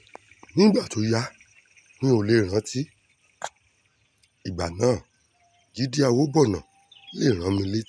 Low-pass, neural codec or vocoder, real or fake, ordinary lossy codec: none; none; real; none